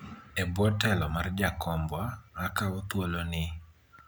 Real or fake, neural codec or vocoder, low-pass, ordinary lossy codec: real; none; none; none